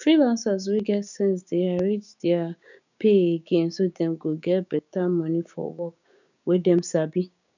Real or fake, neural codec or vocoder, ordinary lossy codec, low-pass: fake; codec, 16 kHz, 6 kbps, DAC; none; 7.2 kHz